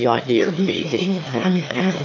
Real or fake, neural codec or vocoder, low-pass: fake; autoencoder, 22.05 kHz, a latent of 192 numbers a frame, VITS, trained on one speaker; 7.2 kHz